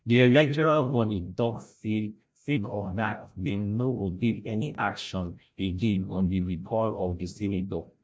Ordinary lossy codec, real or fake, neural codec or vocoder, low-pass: none; fake; codec, 16 kHz, 0.5 kbps, FreqCodec, larger model; none